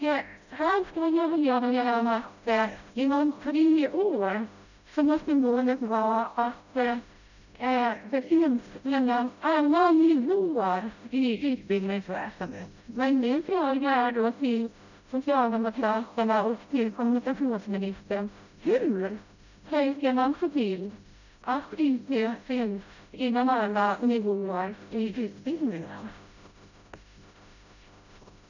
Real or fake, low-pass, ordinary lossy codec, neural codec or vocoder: fake; 7.2 kHz; none; codec, 16 kHz, 0.5 kbps, FreqCodec, smaller model